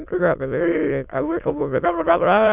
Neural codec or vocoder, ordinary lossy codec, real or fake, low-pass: autoencoder, 22.05 kHz, a latent of 192 numbers a frame, VITS, trained on many speakers; none; fake; 3.6 kHz